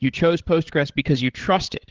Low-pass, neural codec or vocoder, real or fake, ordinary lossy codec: 7.2 kHz; codec, 16 kHz, 8 kbps, FreqCodec, larger model; fake; Opus, 16 kbps